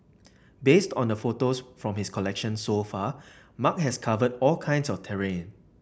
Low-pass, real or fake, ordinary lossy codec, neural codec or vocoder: none; real; none; none